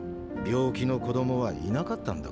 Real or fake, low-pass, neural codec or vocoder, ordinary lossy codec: real; none; none; none